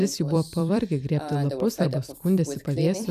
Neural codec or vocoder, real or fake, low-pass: vocoder, 44.1 kHz, 128 mel bands every 256 samples, BigVGAN v2; fake; 14.4 kHz